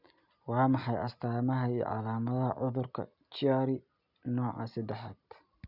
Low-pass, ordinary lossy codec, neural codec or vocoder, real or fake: 5.4 kHz; none; none; real